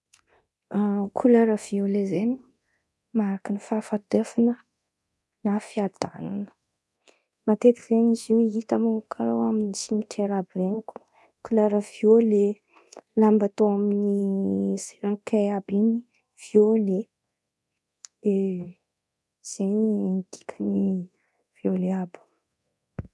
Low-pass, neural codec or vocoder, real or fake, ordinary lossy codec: none; codec, 24 kHz, 0.9 kbps, DualCodec; fake; none